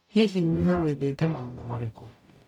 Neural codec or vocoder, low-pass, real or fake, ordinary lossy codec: codec, 44.1 kHz, 0.9 kbps, DAC; 19.8 kHz; fake; none